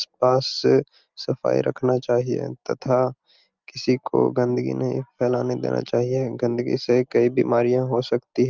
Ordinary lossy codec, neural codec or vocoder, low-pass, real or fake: Opus, 24 kbps; none; 7.2 kHz; real